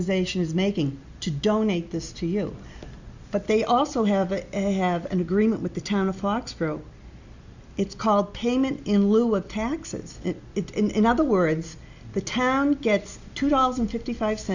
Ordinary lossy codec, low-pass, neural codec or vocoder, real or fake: Opus, 64 kbps; 7.2 kHz; none; real